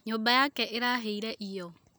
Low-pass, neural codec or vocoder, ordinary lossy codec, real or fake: none; none; none; real